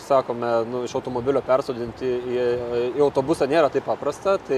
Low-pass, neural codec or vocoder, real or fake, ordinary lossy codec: 14.4 kHz; none; real; AAC, 96 kbps